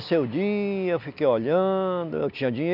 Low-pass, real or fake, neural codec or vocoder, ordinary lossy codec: 5.4 kHz; real; none; none